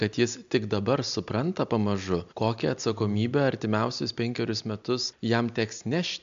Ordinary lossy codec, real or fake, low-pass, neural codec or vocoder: MP3, 64 kbps; real; 7.2 kHz; none